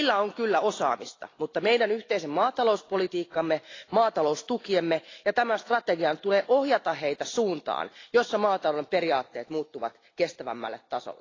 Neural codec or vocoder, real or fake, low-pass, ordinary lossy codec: none; real; 7.2 kHz; AAC, 32 kbps